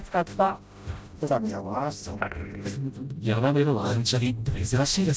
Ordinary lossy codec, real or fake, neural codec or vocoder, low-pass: none; fake; codec, 16 kHz, 0.5 kbps, FreqCodec, smaller model; none